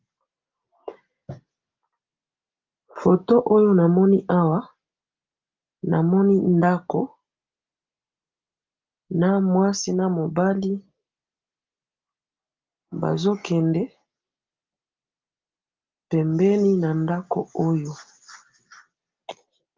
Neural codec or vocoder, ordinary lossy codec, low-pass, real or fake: none; Opus, 24 kbps; 7.2 kHz; real